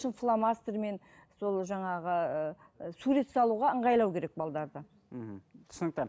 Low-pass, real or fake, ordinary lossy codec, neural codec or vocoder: none; real; none; none